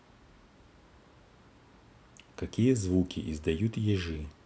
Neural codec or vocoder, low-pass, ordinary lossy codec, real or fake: none; none; none; real